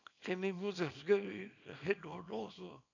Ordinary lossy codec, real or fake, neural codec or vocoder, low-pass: none; fake; codec, 24 kHz, 0.9 kbps, WavTokenizer, small release; 7.2 kHz